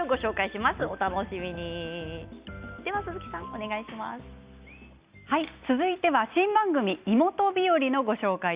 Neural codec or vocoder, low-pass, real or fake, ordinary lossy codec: none; 3.6 kHz; real; Opus, 64 kbps